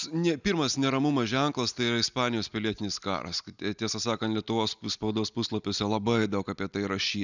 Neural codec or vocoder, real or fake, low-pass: none; real; 7.2 kHz